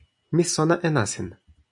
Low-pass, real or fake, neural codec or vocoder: 10.8 kHz; fake; vocoder, 44.1 kHz, 128 mel bands every 256 samples, BigVGAN v2